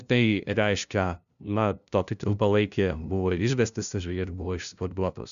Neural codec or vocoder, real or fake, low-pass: codec, 16 kHz, 0.5 kbps, FunCodec, trained on LibriTTS, 25 frames a second; fake; 7.2 kHz